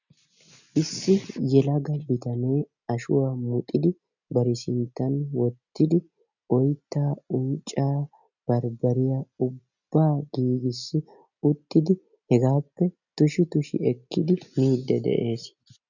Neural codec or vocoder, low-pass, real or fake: none; 7.2 kHz; real